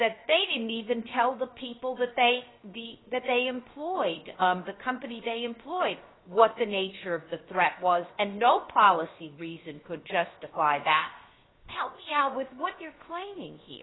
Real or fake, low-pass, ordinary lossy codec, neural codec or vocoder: fake; 7.2 kHz; AAC, 16 kbps; codec, 16 kHz, 0.7 kbps, FocalCodec